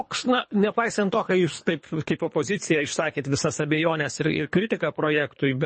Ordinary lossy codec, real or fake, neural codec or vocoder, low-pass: MP3, 32 kbps; fake; codec, 24 kHz, 3 kbps, HILCodec; 9.9 kHz